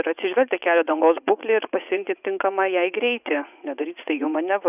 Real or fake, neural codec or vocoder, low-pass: fake; vocoder, 44.1 kHz, 80 mel bands, Vocos; 3.6 kHz